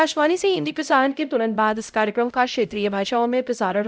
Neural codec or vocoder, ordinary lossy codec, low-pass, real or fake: codec, 16 kHz, 0.5 kbps, X-Codec, HuBERT features, trained on LibriSpeech; none; none; fake